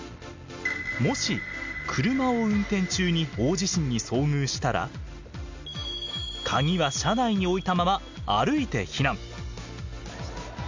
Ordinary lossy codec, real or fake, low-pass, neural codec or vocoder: MP3, 64 kbps; real; 7.2 kHz; none